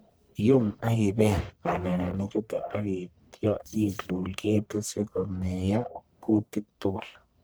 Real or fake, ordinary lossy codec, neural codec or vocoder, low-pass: fake; none; codec, 44.1 kHz, 1.7 kbps, Pupu-Codec; none